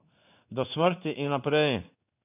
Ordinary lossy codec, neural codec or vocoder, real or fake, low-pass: none; codec, 16 kHz in and 24 kHz out, 1 kbps, XY-Tokenizer; fake; 3.6 kHz